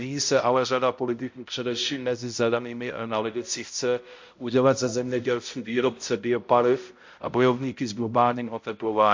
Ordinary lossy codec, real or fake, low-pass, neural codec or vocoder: MP3, 48 kbps; fake; 7.2 kHz; codec, 16 kHz, 0.5 kbps, X-Codec, HuBERT features, trained on balanced general audio